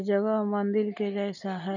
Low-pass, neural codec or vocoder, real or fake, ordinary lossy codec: 7.2 kHz; none; real; none